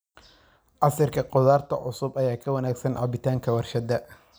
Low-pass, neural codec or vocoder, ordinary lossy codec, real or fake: none; none; none; real